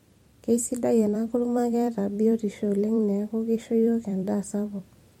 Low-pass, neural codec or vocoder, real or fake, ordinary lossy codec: 19.8 kHz; vocoder, 44.1 kHz, 128 mel bands every 256 samples, BigVGAN v2; fake; MP3, 64 kbps